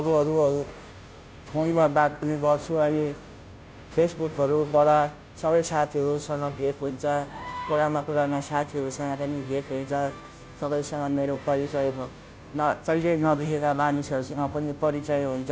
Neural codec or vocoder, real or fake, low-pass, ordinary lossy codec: codec, 16 kHz, 0.5 kbps, FunCodec, trained on Chinese and English, 25 frames a second; fake; none; none